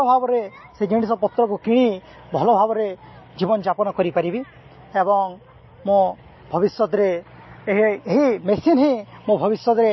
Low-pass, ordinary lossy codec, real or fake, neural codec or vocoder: 7.2 kHz; MP3, 24 kbps; real; none